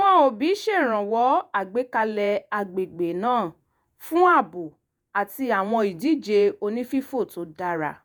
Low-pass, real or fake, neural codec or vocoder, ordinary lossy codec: none; fake; vocoder, 48 kHz, 128 mel bands, Vocos; none